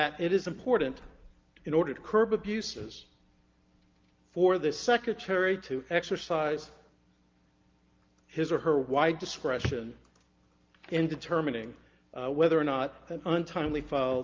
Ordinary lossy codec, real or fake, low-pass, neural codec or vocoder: Opus, 32 kbps; real; 7.2 kHz; none